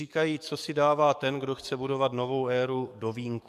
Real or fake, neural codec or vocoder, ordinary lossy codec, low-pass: fake; codec, 44.1 kHz, 7.8 kbps, DAC; MP3, 96 kbps; 14.4 kHz